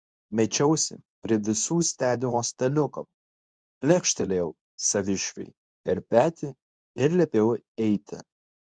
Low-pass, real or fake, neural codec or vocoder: 9.9 kHz; fake; codec, 24 kHz, 0.9 kbps, WavTokenizer, medium speech release version 2